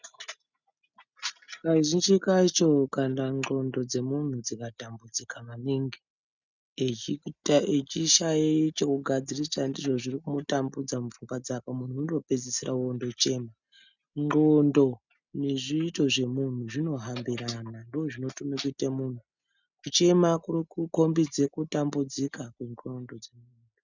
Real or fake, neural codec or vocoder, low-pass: real; none; 7.2 kHz